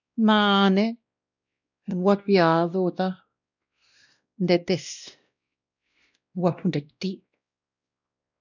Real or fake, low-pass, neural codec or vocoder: fake; 7.2 kHz; codec, 16 kHz, 1 kbps, X-Codec, WavLM features, trained on Multilingual LibriSpeech